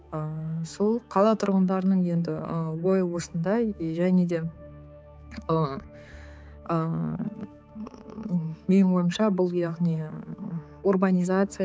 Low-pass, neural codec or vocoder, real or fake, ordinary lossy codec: none; codec, 16 kHz, 4 kbps, X-Codec, HuBERT features, trained on balanced general audio; fake; none